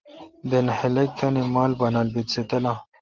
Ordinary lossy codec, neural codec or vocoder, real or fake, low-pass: Opus, 16 kbps; none; real; 7.2 kHz